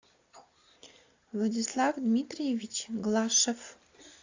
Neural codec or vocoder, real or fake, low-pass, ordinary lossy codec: none; real; 7.2 kHz; AAC, 48 kbps